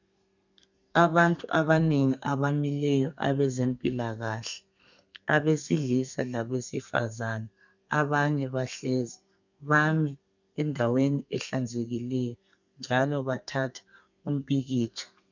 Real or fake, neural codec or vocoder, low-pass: fake; codec, 32 kHz, 1.9 kbps, SNAC; 7.2 kHz